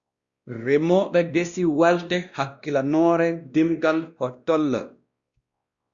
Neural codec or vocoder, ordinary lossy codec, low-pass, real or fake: codec, 16 kHz, 1 kbps, X-Codec, WavLM features, trained on Multilingual LibriSpeech; Opus, 64 kbps; 7.2 kHz; fake